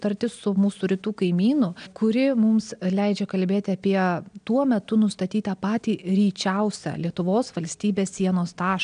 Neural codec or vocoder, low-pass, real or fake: none; 9.9 kHz; real